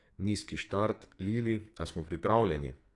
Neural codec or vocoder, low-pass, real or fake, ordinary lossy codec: codec, 44.1 kHz, 2.6 kbps, SNAC; 10.8 kHz; fake; AAC, 48 kbps